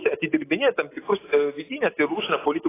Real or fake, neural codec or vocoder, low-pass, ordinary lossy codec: real; none; 3.6 kHz; AAC, 16 kbps